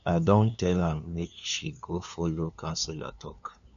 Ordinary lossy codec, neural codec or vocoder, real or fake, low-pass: none; codec, 16 kHz, 2 kbps, FunCodec, trained on LibriTTS, 25 frames a second; fake; 7.2 kHz